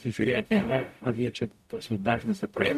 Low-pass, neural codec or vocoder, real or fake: 14.4 kHz; codec, 44.1 kHz, 0.9 kbps, DAC; fake